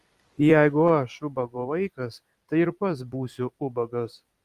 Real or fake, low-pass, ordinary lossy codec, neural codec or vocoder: fake; 14.4 kHz; Opus, 32 kbps; vocoder, 44.1 kHz, 128 mel bands every 256 samples, BigVGAN v2